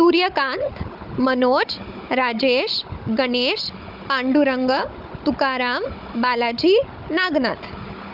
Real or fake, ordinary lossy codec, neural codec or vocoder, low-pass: fake; Opus, 24 kbps; codec, 16 kHz, 16 kbps, FunCodec, trained on Chinese and English, 50 frames a second; 5.4 kHz